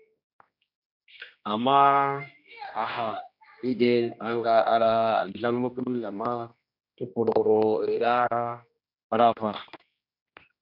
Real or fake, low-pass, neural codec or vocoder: fake; 5.4 kHz; codec, 16 kHz, 1 kbps, X-Codec, HuBERT features, trained on general audio